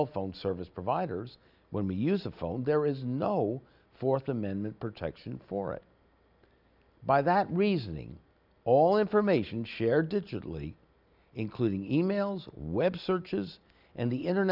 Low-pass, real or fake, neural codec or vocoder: 5.4 kHz; real; none